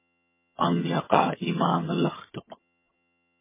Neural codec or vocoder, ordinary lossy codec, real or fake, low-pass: vocoder, 22.05 kHz, 80 mel bands, HiFi-GAN; MP3, 16 kbps; fake; 3.6 kHz